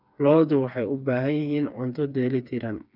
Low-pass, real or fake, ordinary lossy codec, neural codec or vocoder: 5.4 kHz; fake; none; codec, 16 kHz, 4 kbps, FreqCodec, smaller model